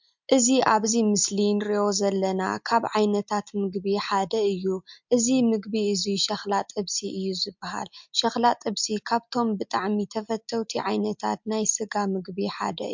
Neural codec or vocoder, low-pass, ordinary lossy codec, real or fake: none; 7.2 kHz; MP3, 64 kbps; real